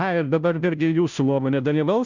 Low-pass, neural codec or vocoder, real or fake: 7.2 kHz; codec, 16 kHz, 0.5 kbps, FunCodec, trained on Chinese and English, 25 frames a second; fake